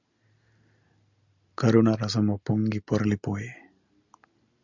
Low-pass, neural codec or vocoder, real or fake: 7.2 kHz; none; real